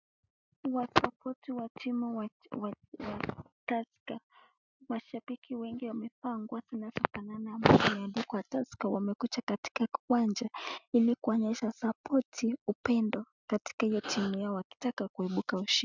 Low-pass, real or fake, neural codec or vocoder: 7.2 kHz; real; none